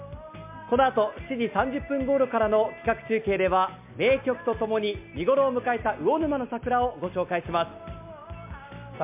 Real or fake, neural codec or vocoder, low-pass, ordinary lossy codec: real; none; 3.6 kHz; MP3, 32 kbps